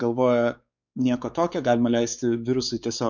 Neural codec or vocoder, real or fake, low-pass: codec, 16 kHz, 4 kbps, X-Codec, WavLM features, trained on Multilingual LibriSpeech; fake; 7.2 kHz